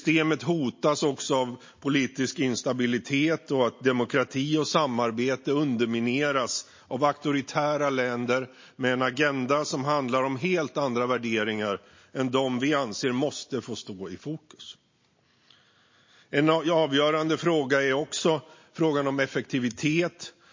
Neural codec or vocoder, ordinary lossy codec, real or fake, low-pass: none; MP3, 32 kbps; real; 7.2 kHz